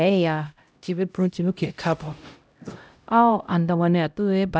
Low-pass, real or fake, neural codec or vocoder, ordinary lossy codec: none; fake; codec, 16 kHz, 0.5 kbps, X-Codec, HuBERT features, trained on LibriSpeech; none